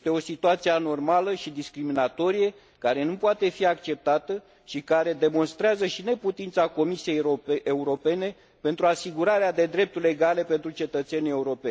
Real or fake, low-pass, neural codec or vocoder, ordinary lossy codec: real; none; none; none